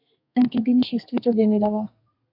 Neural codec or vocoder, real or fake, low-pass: codec, 32 kHz, 1.9 kbps, SNAC; fake; 5.4 kHz